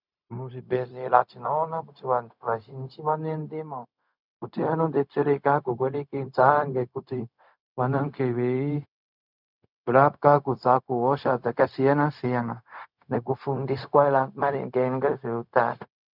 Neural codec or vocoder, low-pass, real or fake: codec, 16 kHz, 0.4 kbps, LongCat-Audio-Codec; 5.4 kHz; fake